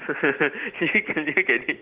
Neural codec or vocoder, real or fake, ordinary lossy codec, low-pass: none; real; Opus, 16 kbps; 3.6 kHz